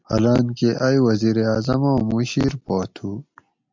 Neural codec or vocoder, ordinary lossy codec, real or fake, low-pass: none; MP3, 64 kbps; real; 7.2 kHz